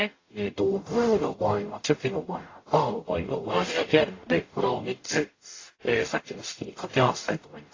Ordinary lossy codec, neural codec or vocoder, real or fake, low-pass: AAC, 32 kbps; codec, 44.1 kHz, 0.9 kbps, DAC; fake; 7.2 kHz